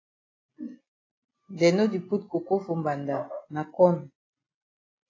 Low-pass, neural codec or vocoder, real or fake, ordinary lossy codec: 7.2 kHz; none; real; AAC, 32 kbps